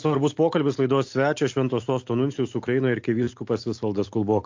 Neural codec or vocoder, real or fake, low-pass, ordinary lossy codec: none; real; 7.2 kHz; MP3, 48 kbps